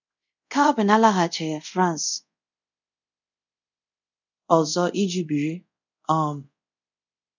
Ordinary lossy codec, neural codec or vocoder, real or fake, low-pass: none; codec, 24 kHz, 0.5 kbps, DualCodec; fake; 7.2 kHz